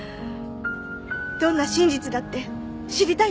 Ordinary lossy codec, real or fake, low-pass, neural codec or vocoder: none; real; none; none